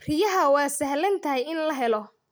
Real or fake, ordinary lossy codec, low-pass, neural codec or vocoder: real; none; none; none